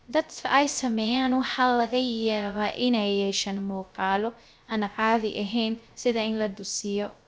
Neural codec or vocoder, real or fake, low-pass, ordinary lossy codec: codec, 16 kHz, 0.3 kbps, FocalCodec; fake; none; none